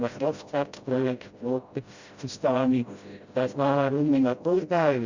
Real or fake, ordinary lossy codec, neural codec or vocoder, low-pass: fake; Opus, 64 kbps; codec, 16 kHz, 0.5 kbps, FreqCodec, smaller model; 7.2 kHz